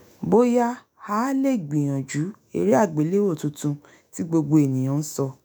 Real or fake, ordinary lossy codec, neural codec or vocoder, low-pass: fake; none; autoencoder, 48 kHz, 128 numbers a frame, DAC-VAE, trained on Japanese speech; none